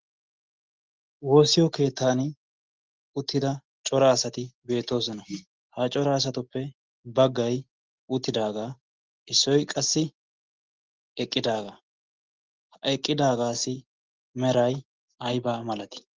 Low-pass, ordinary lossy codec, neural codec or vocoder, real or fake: 7.2 kHz; Opus, 16 kbps; none; real